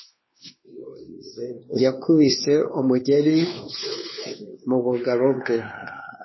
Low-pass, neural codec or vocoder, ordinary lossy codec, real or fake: 7.2 kHz; codec, 16 kHz, 2 kbps, X-Codec, WavLM features, trained on Multilingual LibriSpeech; MP3, 24 kbps; fake